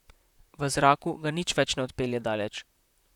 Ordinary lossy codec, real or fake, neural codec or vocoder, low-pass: none; fake; vocoder, 44.1 kHz, 128 mel bands, Pupu-Vocoder; 19.8 kHz